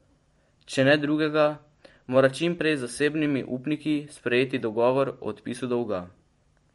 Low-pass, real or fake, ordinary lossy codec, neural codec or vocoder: 19.8 kHz; fake; MP3, 48 kbps; vocoder, 44.1 kHz, 128 mel bands every 512 samples, BigVGAN v2